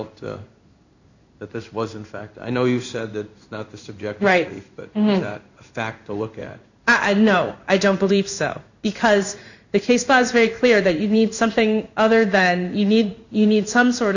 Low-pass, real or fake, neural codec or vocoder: 7.2 kHz; fake; codec, 16 kHz in and 24 kHz out, 1 kbps, XY-Tokenizer